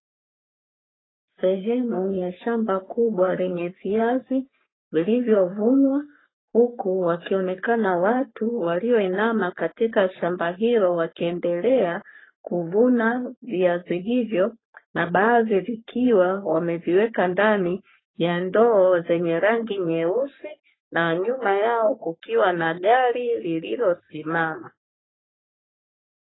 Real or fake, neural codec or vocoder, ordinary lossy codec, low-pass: fake; codec, 44.1 kHz, 3.4 kbps, Pupu-Codec; AAC, 16 kbps; 7.2 kHz